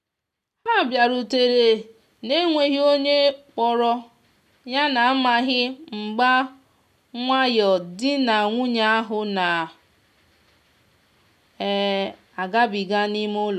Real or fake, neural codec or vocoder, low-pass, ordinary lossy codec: real; none; 14.4 kHz; none